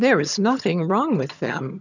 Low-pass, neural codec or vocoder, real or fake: 7.2 kHz; vocoder, 22.05 kHz, 80 mel bands, HiFi-GAN; fake